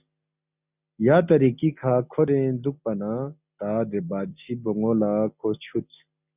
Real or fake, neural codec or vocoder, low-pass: real; none; 3.6 kHz